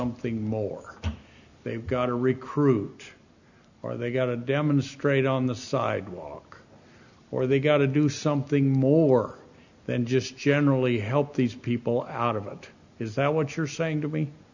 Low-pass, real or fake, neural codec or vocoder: 7.2 kHz; real; none